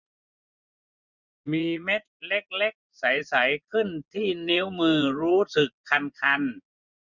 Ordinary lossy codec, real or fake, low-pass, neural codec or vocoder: none; fake; 7.2 kHz; vocoder, 24 kHz, 100 mel bands, Vocos